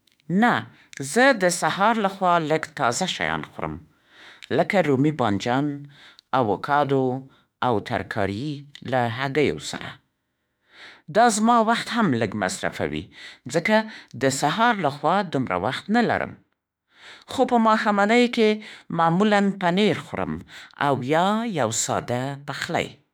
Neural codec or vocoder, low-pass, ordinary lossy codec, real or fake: autoencoder, 48 kHz, 32 numbers a frame, DAC-VAE, trained on Japanese speech; none; none; fake